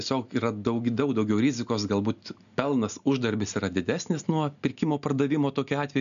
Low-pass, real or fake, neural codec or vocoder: 7.2 kHz; real; none